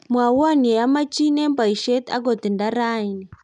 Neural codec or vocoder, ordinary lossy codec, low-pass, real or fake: none; none; 10.8 kHz; real